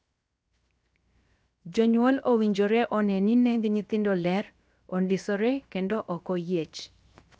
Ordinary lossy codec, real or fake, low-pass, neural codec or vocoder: none; fake; none; codec, 16 kHz, 0.7 kbps, FocalCodec